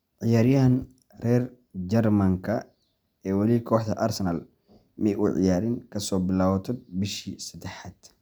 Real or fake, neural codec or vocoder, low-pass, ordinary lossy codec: real; none; none; none